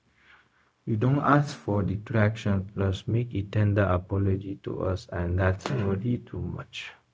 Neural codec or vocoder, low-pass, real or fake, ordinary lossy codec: codec, 16 kHz, 0.4 kbps, LongCat-Audio-Codec; none; fake; none